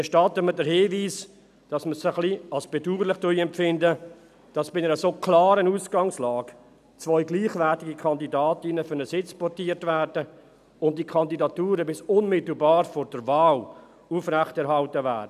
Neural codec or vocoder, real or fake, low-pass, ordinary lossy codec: none; real; 14.4 kHz; none